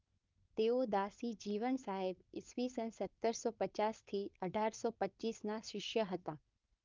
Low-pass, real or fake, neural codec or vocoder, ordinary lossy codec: 7.2 kHz; fake; codec, 16 kHz, 4.8 kbps, FACodec; Opus, 24 kbps